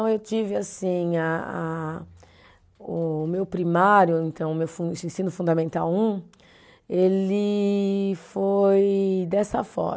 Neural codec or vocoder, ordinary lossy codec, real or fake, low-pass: none; none; real; none